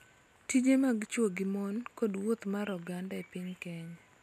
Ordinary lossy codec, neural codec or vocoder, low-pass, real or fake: MP3, 96 kbps; none; 14.4 kHz; real